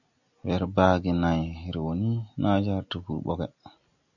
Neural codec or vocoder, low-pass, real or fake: none; 7.2 kHz; real